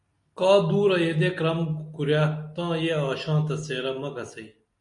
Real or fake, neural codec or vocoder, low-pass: real; none; 10.8 kHz